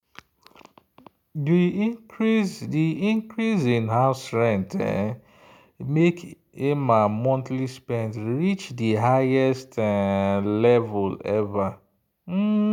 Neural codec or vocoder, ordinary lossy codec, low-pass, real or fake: none; none; 19.8 kHz; real